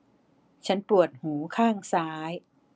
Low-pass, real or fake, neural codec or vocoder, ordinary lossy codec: none; real; none; none